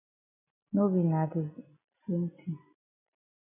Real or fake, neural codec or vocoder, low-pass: real; none; 3.6 kHz